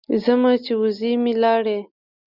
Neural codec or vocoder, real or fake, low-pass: none; real; 5.4 kHz